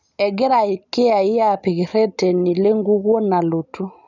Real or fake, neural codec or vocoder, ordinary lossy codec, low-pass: real; none; none; 7.2 kHz